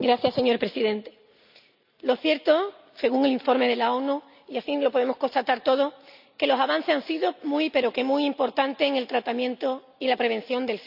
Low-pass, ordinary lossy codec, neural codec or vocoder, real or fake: 5.4 kHz; none; none; real